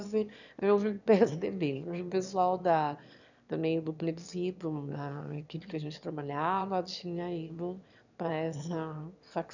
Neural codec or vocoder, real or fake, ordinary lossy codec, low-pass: autoencoder, 22.05 kHz, a latent of 192 numbers a frame, VITS, trained on one speaker; fake; none; 7.2 kHz